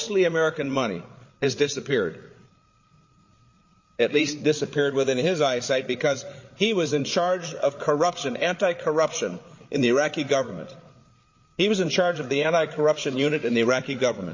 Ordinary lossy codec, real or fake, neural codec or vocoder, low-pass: MP3, 32 kbps; fake; codec, 16 kHz, 8 kbps, FreqCodec, larger model; 7.2 kHz